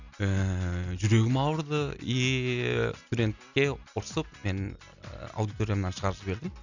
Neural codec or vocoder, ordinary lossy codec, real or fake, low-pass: none; none; real; 7.2 kHz